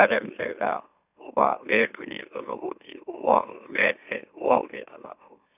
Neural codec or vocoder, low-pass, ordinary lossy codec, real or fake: autoencoder, 44.1 kHz, a latent of 192 numbers a frame, MeloTTS; 3.6 kHz; none; fake